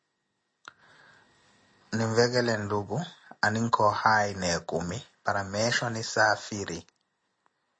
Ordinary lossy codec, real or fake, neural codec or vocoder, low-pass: MP3, 32 kbps; real; none; 9.9 kHz